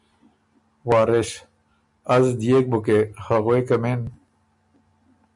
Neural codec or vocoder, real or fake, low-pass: none; real; 10.8 kHz